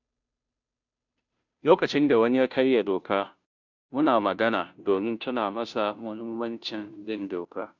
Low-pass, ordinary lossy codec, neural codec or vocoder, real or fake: 7.2 kHz; none; codec, 16 kHz, 0.5 kbps, FunCodec, trained on Chinese and English, 25 frames a second; fake